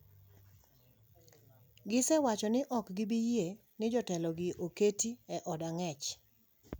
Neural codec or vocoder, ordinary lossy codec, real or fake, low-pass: none; none; real; none